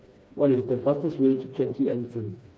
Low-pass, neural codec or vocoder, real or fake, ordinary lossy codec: none; codec, 16 kHz, 2 kbps, FreqCodec, smaller model; fake; none